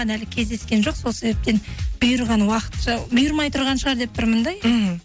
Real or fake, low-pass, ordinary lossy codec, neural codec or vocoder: real; none; none; none